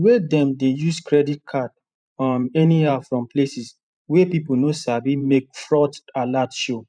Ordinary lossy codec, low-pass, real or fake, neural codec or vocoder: none; 9.9 kHz; fake; vocoder, 44.1 kHz, 128 mel bands every 512 samples, BigVGAN v2